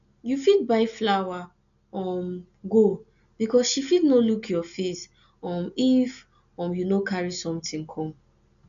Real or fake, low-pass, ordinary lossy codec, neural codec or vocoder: real; 7.2 kHz; none; none